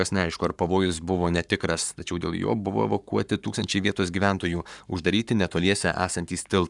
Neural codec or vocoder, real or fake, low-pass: codec, 44.1 kHz, 7.8 kbps, Pupu-Codec; fake; 10.8 kHz